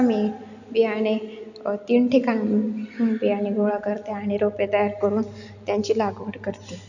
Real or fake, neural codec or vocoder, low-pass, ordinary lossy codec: real; none; 7.2 kHz; none